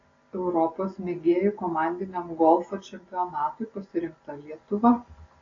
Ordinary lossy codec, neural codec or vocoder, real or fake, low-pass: AAC, 32 kbps; none; real; 7.2 kHz